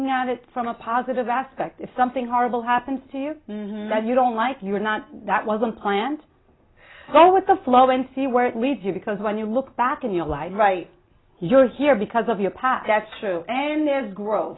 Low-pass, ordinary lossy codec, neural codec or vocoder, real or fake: 7.2 kHz; AAC, 16 kbps; none; real